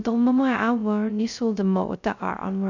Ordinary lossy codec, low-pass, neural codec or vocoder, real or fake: none; 7.2 kHz; codec, 16 kHz, 0.2 kbps, FocalCodec; fake